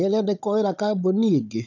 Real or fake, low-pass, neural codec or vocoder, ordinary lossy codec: fake; 7.2 kHz; codec, 16 kHz, 16 kbps, FunCodec, trained on Chinese and English, 50 frames a second; none